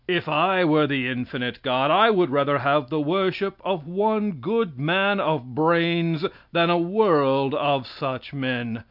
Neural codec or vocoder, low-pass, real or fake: none; 5.4 kHz; real